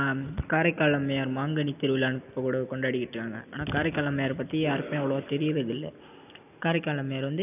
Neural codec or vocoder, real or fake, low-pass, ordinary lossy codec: none; real; 3.6 kHz; none